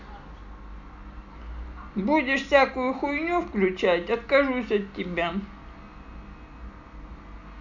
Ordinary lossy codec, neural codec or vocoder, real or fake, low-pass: none; none; real; 7.2 kHz